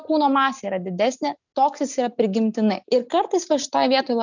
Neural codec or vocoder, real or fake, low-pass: none; real; 7.2 kHz